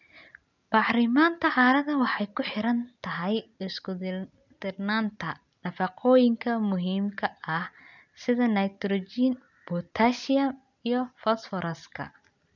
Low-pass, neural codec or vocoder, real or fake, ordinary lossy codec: 7.2 kHz; none; real; none